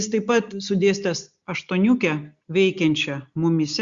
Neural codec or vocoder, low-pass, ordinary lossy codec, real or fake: none; 7.2 kHz; Opus, 64 kbps; real